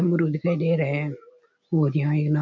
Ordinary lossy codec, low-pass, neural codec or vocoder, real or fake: none; 7.2 kHz; none; real